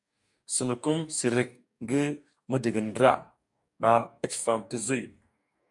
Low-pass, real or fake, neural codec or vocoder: 10.8 kHz; fake; codec, 44.1 kHz, 2.6 kbps, DAC